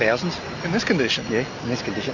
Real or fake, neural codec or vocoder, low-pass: real; none; 7.2 kHz